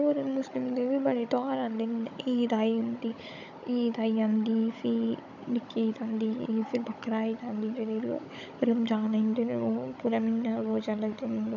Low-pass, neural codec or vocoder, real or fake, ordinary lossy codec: 7.2 kHz; codec, 16 kHz, 16 kbps, FunCodec, trained on Chinese and English, 50 frames a second; fake; none